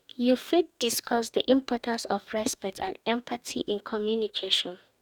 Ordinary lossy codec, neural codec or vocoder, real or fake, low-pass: none; codec, 44.1 kHz, 2.6 kbps, DAC; fake; 19.8 kHz